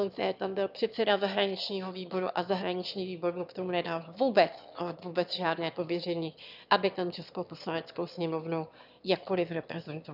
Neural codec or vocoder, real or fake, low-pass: autoencoder, 22.05 kHz, a latent of 192 numbers a frame, VITS, trained on one speaker; fake; 5.4 kHz